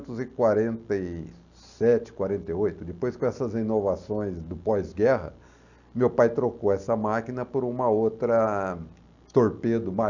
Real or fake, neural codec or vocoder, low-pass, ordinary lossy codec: real; none; 7.2 kHz; none